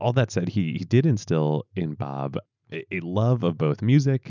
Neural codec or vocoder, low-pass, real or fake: none; 7.2 kHz; real